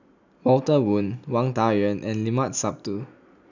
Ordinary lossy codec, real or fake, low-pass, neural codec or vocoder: none; real; 7.2 kHz; none